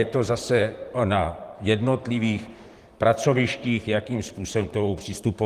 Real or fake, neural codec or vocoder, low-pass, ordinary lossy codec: fake; vocoder, 44.1 kHz, 128 mel bands, Pupu-Vocoder; 14.4 kHz; Opus, 24 kbps